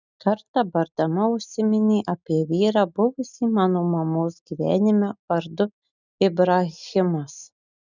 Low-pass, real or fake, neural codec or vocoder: 7.2 kHz; real; none